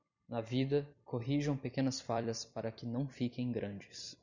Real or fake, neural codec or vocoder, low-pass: fake; vocoder, 44.1 kHz, 128 mel bands every 256 samples, BigVGAN v2; 7.2 kHz